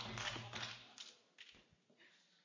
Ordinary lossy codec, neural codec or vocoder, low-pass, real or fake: MP3, 48 kbps; none; 7.2 kHz; real